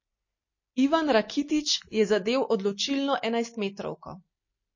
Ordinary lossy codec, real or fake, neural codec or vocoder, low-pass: MP3, 32 kbps; real; none; 7.2 kHz